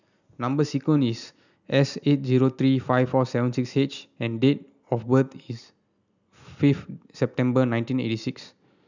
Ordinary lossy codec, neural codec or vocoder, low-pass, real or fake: none; none; 7.2 kHz; real